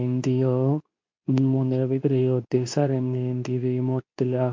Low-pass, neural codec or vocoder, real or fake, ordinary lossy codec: 7.2 kHz; codec, 24 kHz, 0.9 kbps, WavTokenizer, medium speech release version 2; fake; MP3, 48 kbps